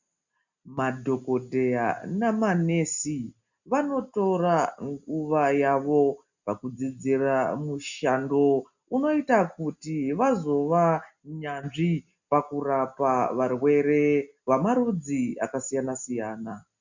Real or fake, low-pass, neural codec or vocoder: real; 7.2 kHz; none